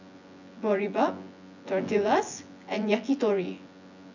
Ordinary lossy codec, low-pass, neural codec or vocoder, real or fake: none; 7.2 kHz; vocoder, 24 kHz, 100 mel bands, Vocos; fake